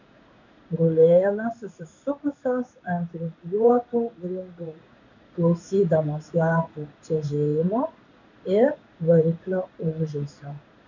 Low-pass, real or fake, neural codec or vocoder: 7.2 kHz; fake; codec, 16 kHz in and 24 kHz out, 1 kbps, XY-Tokenizer